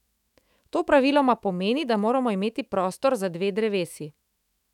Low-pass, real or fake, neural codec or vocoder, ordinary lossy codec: 19.8 kHz; fake; autoencoder, 48 kHz, 128 numbers a frame, DAC-VAE, trained on Japanese speech; none